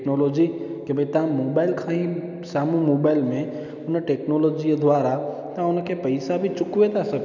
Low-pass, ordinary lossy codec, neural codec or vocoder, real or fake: 7.2 kHz; none; none; real